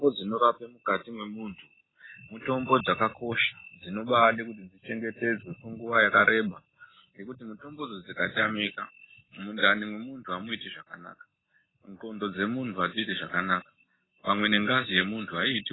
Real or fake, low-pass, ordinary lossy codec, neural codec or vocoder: real; 7.2 kHz; AAC, 16 kbps; none